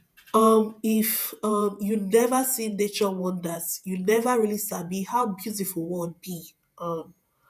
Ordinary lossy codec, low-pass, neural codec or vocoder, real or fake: none; 14.4 kHz; vocoder, 48 kHz, 128 mel bands, Vocos; fake